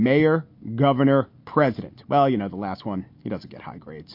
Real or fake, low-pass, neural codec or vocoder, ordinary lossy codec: real; 5.4 kHz; none; MP3, 32 kbps